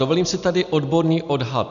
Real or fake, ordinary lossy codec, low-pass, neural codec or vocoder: real; MP3, 96 kbps; 7.2 kHz; none